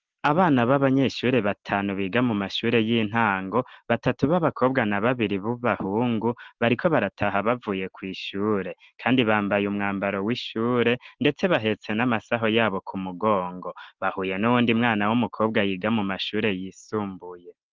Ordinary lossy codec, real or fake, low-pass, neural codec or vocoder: Opus, 16 kbps; real; 7.2 kHz; none